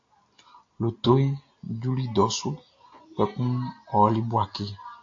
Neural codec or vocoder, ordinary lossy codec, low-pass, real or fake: none; AAC, 48 kbps; 7.2 kHz; real